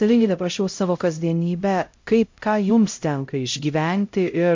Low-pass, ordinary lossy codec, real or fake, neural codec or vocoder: 7.2 kHz; MP3, 48 kbps; fake; codec, 16 kHz, 0.5 kbps, X-Codec, HuBERT features, trained on LibriSpeech